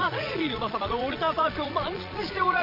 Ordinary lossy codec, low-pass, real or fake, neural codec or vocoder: MP3, 32 kbps; 5.4 kHz; fake; vocoder, 22.05 kHz, 80 mel bands, Vocos